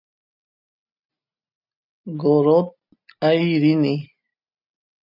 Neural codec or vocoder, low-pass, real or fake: none; 5.4 kHz; real